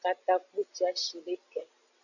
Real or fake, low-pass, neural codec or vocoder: real; 7.2 kHz; none